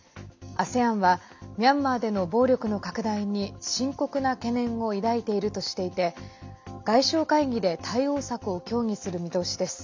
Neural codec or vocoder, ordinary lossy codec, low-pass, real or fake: none; MP3, 64 kbps; 7.2 kHz; real